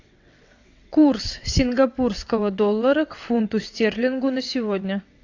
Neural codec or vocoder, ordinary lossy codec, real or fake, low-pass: vocoder, 22.05 kHz, 80 mel bands, WaveNeXt; AAC, 48 kbps; fake; 7.2 kHz